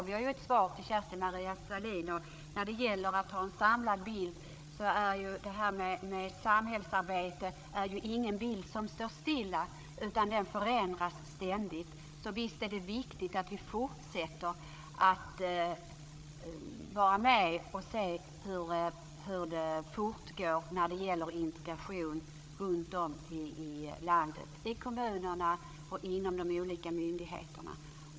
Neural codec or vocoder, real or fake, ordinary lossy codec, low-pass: codec, 16 kHz, 8 kbps, FreqCodec, larger model; fake; none; none